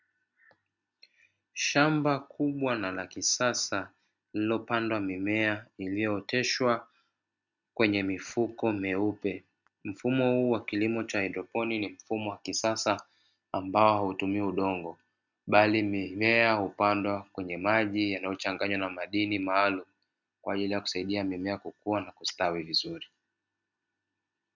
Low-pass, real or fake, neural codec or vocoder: 7.2 kHz; real; none